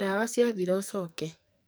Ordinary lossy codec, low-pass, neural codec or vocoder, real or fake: none; none; codec, 44.1 kHz, 2.6 kbps, SNAC; fake